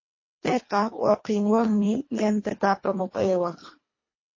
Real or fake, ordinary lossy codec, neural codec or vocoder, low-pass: fake; MP3, 32 kbps; codec, 24 kHz, 1.5 kbps, HILCodec; 7.2 kHz